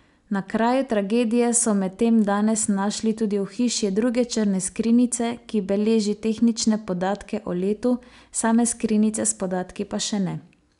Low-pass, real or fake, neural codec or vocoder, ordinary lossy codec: 10.8 kHz; real; none; none